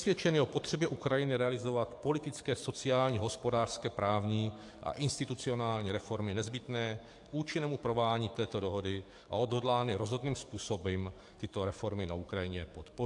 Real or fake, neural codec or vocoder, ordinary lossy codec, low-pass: fake; codec, 44.1 kHz, 7.8 kbps, DAC; AAC, 64 kbps; 10.8 kHz